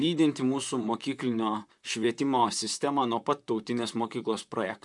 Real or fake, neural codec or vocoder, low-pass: fake; vocoder, 44.1 kHz, 128 mel bands, Pupu-Vocoder; 10.8 kHz